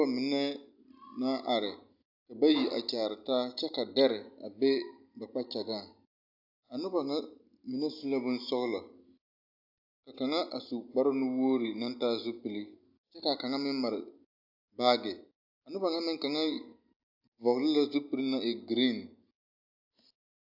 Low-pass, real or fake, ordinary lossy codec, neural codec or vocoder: 5.4 kHz; real; AAC, 48 kbps; none